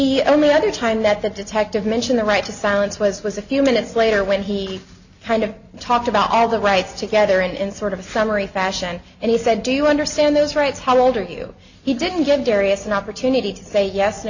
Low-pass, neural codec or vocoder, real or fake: 7.2 kHz; none; real